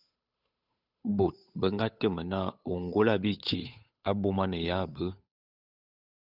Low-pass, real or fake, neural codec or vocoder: 5.4 kHz; fake; codec, 16 kHz, 8 kbps, FunCodec, trained on Chinese and English, 25 frames a second